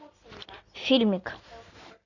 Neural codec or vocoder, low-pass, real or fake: none; 7.2 kHz; real